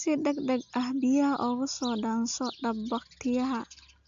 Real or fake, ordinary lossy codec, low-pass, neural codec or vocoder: real; AAC, 64 kbps; 7.2 kHz; none